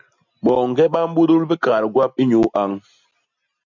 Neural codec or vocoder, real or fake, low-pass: none; real; 7.2 kHz